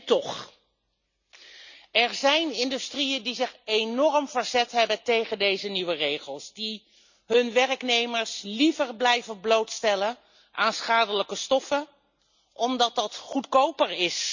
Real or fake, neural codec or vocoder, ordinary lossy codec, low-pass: real; none; none; 7.2 kHz